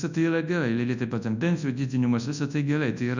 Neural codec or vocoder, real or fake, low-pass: codec, 24 kHz, 0.9 kbps, WavTokenizer, large speech release; fake; 7.2 kHz